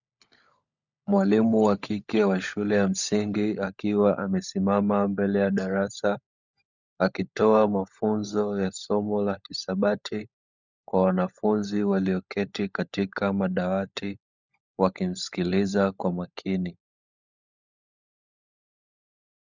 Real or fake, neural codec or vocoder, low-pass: fake; codec, 16 kHz, 16 kbps, FunCodec, trained on LibriTTS, 50 frames a second; 7.2 kHz